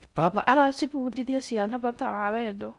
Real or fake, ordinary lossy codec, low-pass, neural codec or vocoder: fake; none; 10.8 kHz; codec, 16 kHz in and 24 kHz out, 0.6 kbps, FocalCodec, streaming, 4096 codes